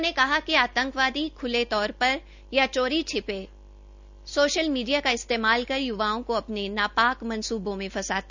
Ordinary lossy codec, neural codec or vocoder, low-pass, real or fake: none; none; 7.2 kHz; real